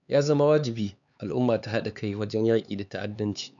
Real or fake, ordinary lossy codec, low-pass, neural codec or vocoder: fake; none; 7.2 kHz; codec, 16 kHz, 4 kbps, X-Codec, HuBERT features, trained on LibriSpeech